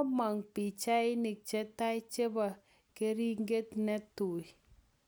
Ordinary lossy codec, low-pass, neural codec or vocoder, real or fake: none; none; none; real